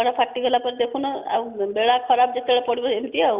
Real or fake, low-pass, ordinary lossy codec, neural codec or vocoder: real; 3.6 kHz; Opus, 64 kbps; none